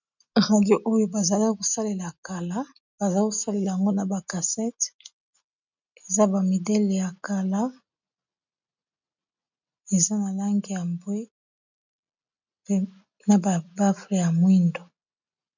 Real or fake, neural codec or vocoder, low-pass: real; none; 7.2 kHz